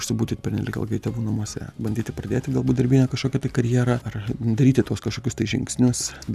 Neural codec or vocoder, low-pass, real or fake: none; 14.4 kHz; real